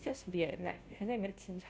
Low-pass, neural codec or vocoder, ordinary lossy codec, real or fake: none; codec, 16 kHz, 0.5 kbps, FunCodec, trained on Chinese and English, 25 frames a second; none; fake